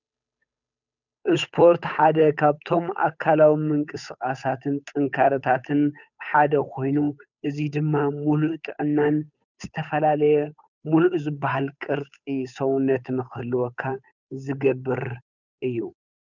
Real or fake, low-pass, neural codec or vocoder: fake; 7.2 kHz; codec, 16 kHz, 8 kbps, FunCodec, trained on Chinese and English, 25 frames a second